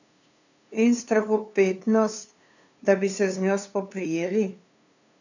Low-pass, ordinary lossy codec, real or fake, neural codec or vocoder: 7.2 kHz; none; fake; codec, 16 kHz, 2 kbps, FunCodec, trained on LibriTTS, 25 frames a second